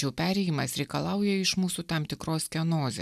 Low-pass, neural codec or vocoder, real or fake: 14.4 kHz; none; real